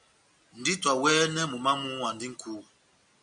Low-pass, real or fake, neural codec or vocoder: 9.9 kHz; real; none